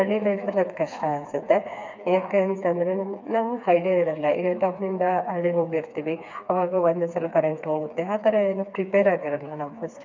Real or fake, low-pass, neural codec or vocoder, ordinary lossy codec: fake; 7.2 kHz; codec, 16 kHz, 4 kbps, FreqCodec, smaller model; MP3, 64 kbps